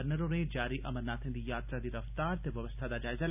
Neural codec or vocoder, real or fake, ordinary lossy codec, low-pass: none; real; none; 3.6 kHz